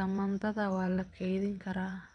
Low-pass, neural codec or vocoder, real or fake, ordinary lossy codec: 9.9 kHz; vocoder, 22.05 kHz, 80 mel bands, WaveNeXt; fake; none